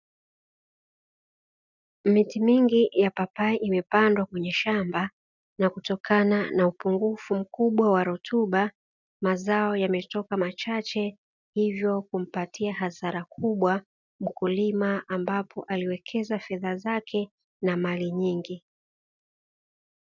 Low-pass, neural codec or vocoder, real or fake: 7.2 kHz; none; real